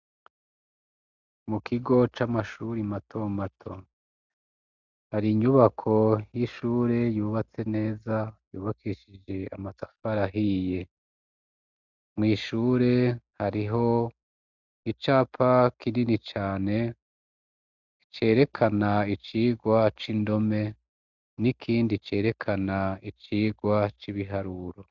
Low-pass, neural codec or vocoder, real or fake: 7.2 kHz; none; real